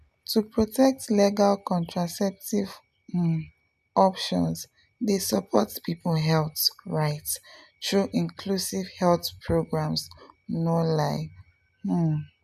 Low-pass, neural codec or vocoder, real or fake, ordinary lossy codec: 14.4 kHz; none; real; none